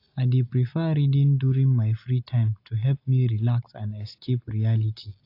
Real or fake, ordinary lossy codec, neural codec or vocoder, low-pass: real; AAC, 32 kbps; none; 5.4 kHz